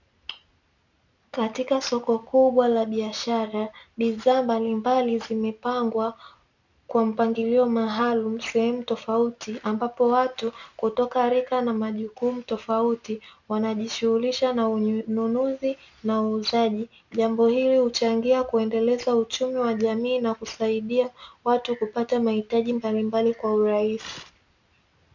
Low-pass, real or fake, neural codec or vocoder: 7.2 kHz; real; none